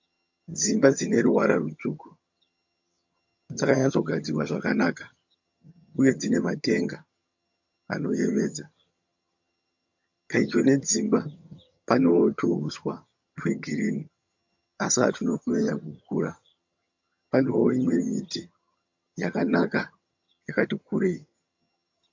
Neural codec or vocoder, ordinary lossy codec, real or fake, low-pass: vocoder, 22.05 kHz, 80 mel bands, HiFi-GAN; MP3, 48 kbps; fake; 7.2 kHz